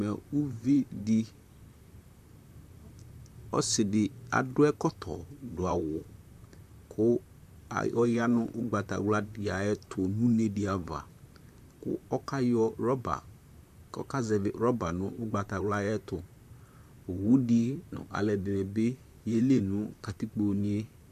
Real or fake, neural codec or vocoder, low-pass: fake; vocoder, 44.1 kHz, 128 mel bands, Pupu-Vocoder; 14.4 kHz